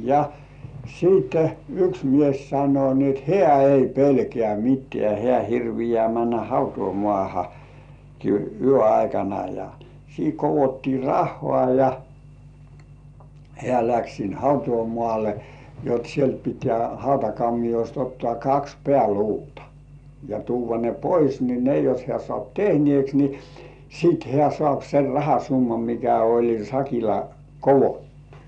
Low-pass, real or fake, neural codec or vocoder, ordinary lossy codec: 9.9 kHz; real; none; none